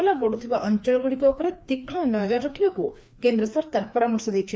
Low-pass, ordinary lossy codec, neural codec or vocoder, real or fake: none; none; codec, 16 kHz, 2 kbps, FreqCodec, larger model; fake